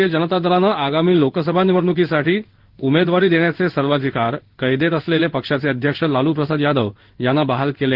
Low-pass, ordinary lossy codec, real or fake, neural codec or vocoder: 5.4 kHz; Opus, 16 kbps; fake; codec, 16 kHz in and 24 kHz out, 1 kbps, XY-Tokenizer